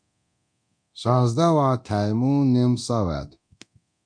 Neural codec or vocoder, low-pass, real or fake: codec, 24 kHz, 0.9 kbps, DualCodec; 9.9 kHz; fake